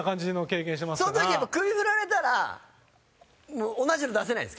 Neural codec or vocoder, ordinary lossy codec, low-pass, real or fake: none; none; none; real